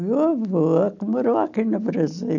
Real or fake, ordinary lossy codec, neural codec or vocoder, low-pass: real; none; none; 7.2 kHz